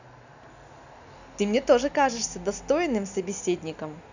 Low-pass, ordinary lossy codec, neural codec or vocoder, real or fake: 7.2 kHz; none; none; real